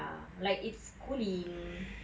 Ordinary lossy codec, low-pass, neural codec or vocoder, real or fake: none; none; none; real